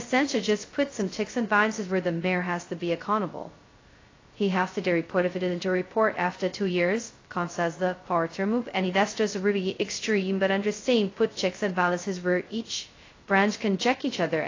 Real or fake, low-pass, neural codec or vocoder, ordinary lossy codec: fake; 7.2 kHz; codec, 16 kHz, 0.2 kbps, FocalCodec; AAC, 32 kbps